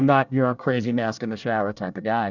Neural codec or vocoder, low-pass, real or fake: codec, 24 kHz, 1 kbps, SNAC; 7.2 kHz; fake